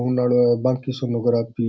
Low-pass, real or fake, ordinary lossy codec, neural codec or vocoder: none; real; none; none